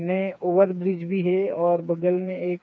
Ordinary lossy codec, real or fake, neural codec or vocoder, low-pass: none; fake; codec, 16 kHz, 4 kbps, FreqCodec, smaller model; none